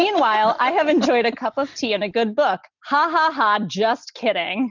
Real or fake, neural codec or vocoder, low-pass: real; none; 7.2 kHz